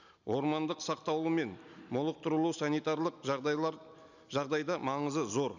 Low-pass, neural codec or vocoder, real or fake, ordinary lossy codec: 7.2 kHz; none; real; none